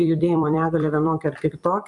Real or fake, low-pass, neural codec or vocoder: fake; 10.8 kHz; vocoder, 44.1 kHz, 128 mel bands every 256 samples, BigVGAN v2